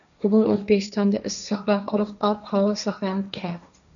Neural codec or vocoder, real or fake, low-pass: codec, 16 kHz, 1.1 kbps, Voila-Tokenizer; fake; 7.2 kHz